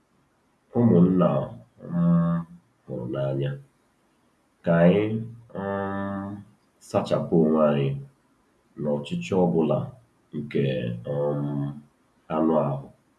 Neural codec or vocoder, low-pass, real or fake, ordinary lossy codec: none; none; real; none